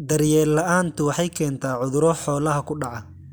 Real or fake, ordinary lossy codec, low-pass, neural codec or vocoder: real; none; none; none